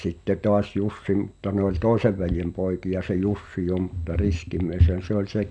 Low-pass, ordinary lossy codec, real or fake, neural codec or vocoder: 10.8 kHz; none; real; none